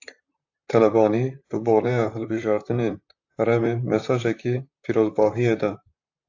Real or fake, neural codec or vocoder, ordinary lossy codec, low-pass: fake; vocoder, 44.1 kHz, 128 mel bands, Pupu-Vocoder; AAC, 48 kbps; 7.2 kHz